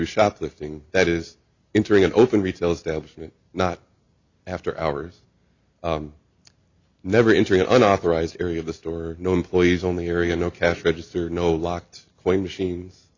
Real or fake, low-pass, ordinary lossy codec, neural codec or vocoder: real; 7.2 kHz; Opus, 64 kbps; none